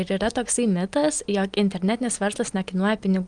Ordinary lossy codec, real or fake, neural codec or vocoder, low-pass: Opus, 24 kbps; real; none; 9.9 kHz